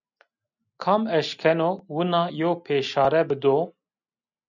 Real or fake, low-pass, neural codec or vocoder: real; 7.2 kHz; none